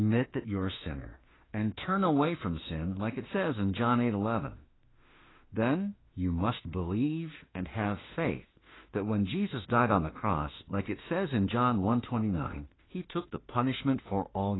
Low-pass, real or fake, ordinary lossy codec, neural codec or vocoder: 7.2 kHz; fake; AAC, 16 kbps; autoencoder, 48 kHz, 32 numbers a frame, DAC-VAE, trained on Japanese speech